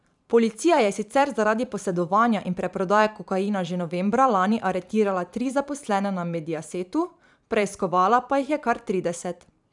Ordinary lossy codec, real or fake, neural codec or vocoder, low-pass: none; fake; vocoder, 44.1 kHz, 128 mel bands every 512 samples, BigVGAN v2; 10.8 kHz